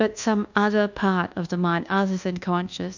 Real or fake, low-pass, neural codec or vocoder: fake; 7.2 kHz; codec, 24 kHz, 1.2 kbps, DualCodec